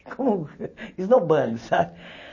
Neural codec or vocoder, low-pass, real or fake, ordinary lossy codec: none; 7.2 kHz; real; MP3, 32 kbps